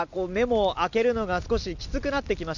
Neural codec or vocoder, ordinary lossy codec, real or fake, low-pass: none; none; real; 7.2 kHz